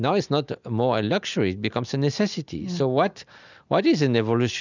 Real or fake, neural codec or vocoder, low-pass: real; none; 7.2 kHz